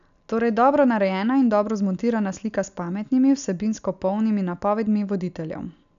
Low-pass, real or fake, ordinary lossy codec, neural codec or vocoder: 7.2 kHz; real; none; none